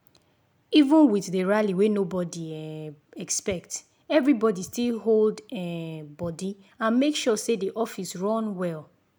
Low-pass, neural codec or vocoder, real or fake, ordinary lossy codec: none; none; real; none